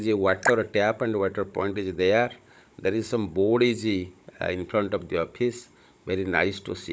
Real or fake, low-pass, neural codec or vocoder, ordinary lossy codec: fake; none; codec, 16 kHz, 16 kbps, FunCodec, trained on Chinese and English, 50 frames a second; none